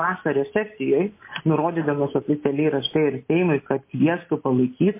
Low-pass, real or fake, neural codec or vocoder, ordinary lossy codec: 3.6 kHz; real; none; MP3, 24 kbps